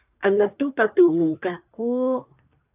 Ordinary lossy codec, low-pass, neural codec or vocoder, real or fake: AAC, 24 kbps; 3.6 kHz; codec, 24 kHz, 1 kbps, SNAC; fake